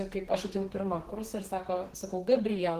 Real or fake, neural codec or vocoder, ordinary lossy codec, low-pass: fake; codec, 32 kHz, 1.9 kbps, SNAC; Opus, 16 kbps; 14.4 kHz